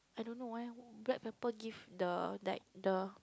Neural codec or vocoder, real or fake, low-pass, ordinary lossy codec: none; real; none; none